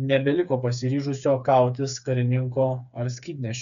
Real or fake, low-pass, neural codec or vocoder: fake; 7.2 kHz; codec, 16 kHz, 4 kbps, FreqCodec, smaller model